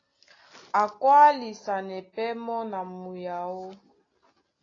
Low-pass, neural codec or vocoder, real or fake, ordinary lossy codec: 7.2 kHz; none; real; AAC, 32 kbps